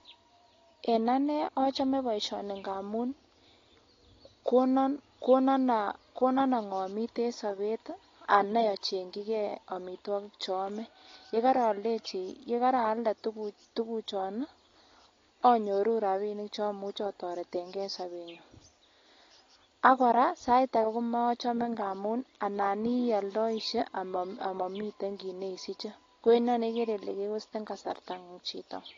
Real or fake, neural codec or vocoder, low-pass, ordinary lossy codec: real; none; 7.2 kHz; AAC, 32 kbps